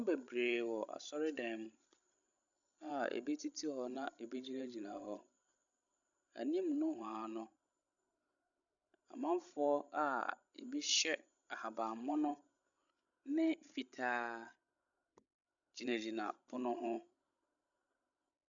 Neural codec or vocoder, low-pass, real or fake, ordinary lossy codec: codec, 16 kHz, 16 kbps, FreqCodec, larger model; 7.2 kHz; fake; MP3, 96 kbps